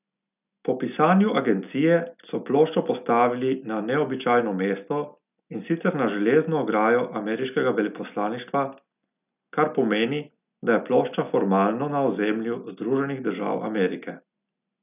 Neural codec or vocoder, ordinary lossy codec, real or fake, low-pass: none; none; real; 3.6 kHz